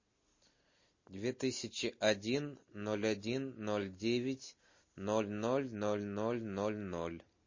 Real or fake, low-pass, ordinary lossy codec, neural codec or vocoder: real; 7.2 kHz; MP3, 32 kbps; none